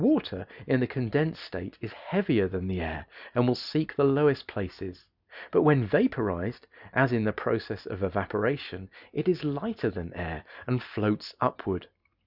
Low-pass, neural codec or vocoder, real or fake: 5.4 kHz; none; real